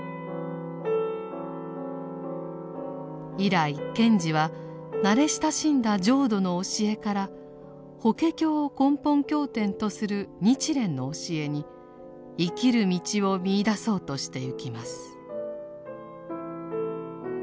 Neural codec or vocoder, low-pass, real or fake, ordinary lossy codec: none; none; real; none